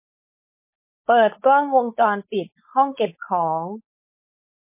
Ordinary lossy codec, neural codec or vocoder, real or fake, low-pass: MP3, 24 kbps; codec, 16 kHz, 4.8 kbps, FACodec; fake; 3.6 kHz